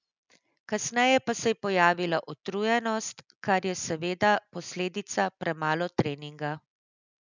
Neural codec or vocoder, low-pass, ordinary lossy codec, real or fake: none; 7.2 kHz; none; real